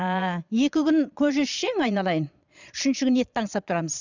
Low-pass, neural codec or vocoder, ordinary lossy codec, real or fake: 7.2 kHz; vocoder, 22.05 kHz, 80 mel bands, Vocos; none; fake